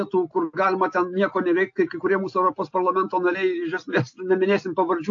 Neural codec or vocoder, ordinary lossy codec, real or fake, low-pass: none; AAC, 48 kbps; real; 7.2 kHz